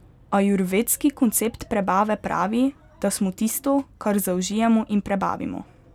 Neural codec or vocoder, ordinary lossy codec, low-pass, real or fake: none; none; 19.8 kHz; real